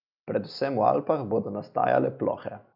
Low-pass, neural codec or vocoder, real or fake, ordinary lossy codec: 5.4 kHz; none; real; none